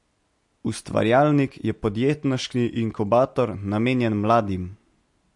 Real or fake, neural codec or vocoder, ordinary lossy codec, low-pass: real; none; MP3, 48 kbps; 10.8 kHz